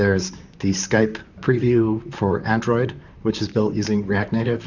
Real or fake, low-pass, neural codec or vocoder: fake; 7.2 kHz; vocoder, 44.1 kHz, 128 mel bands, Pupu-Vocoder